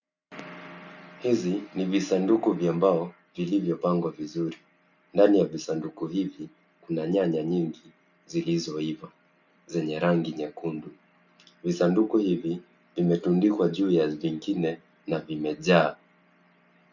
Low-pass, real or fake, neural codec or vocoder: 7.2 kHz; real; none